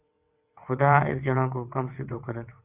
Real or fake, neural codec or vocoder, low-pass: fake; vocoder, 22.05 kHz, 80 mel bands, WaveNeXt; 3.6 kHz